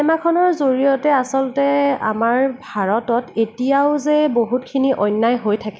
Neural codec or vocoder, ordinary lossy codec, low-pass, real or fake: none; none; none; real